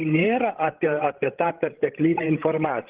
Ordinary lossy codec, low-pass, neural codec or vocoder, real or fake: Opus, 16 kbps; 3.6 kHz; codec, 16 kHz, 16 kbps, FreqCodec, larger model; fake